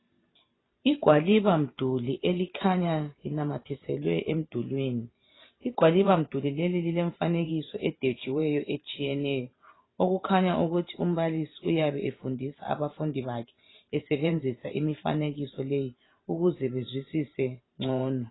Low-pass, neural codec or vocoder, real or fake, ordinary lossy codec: 7.2 kHz; vocoder, 44.1 kHz, 128 mel bands every 512 samples, BigVGAN v2; fake; AAC, 16 kbps